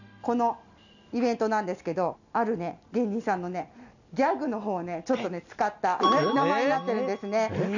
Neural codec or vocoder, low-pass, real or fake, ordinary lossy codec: none; 7.2 kHz; real; none